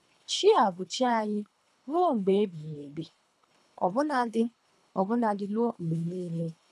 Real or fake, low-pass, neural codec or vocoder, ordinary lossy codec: fake; none; codec, 24 kHz, 3 kbps, HILCodec; none